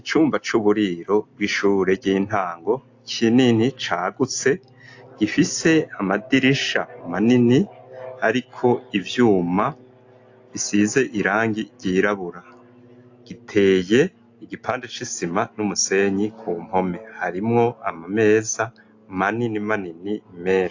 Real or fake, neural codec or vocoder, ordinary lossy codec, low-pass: real; none; AAC, 48 kbps; 7.2 kHz